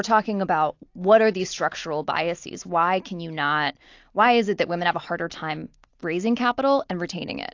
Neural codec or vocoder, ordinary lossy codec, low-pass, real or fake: none; AAC, 48 kbps; 7.2 kHz; real